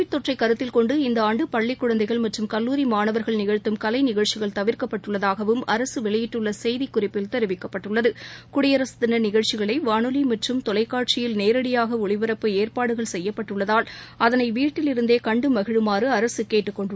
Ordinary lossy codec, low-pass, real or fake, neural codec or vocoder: none; none; real; none